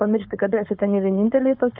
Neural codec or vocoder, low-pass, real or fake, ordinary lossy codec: codec, 16 kHz, 4.8 kbps, FACodec; 5.4 kHz; fake; AAC, 48 kbps